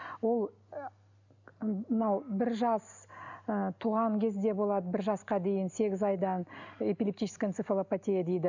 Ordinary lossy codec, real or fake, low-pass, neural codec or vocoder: none; real; 7.2 kHz; none